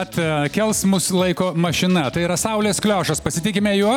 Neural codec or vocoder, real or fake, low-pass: none; real; 19.8 kHz